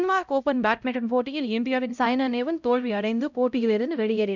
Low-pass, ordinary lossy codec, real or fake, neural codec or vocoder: 7.2 kHz; none; fake; codec, 16 kHz, 0.5 kbps, X-Codec, HuBERT features, trained on LibriSpeech